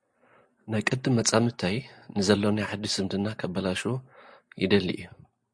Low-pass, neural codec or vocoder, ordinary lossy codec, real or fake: 9.9 kHz; none; MP3, 64 kbps; real